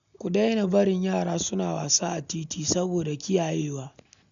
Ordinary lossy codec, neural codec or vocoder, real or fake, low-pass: none; none; real; 7.2 kHz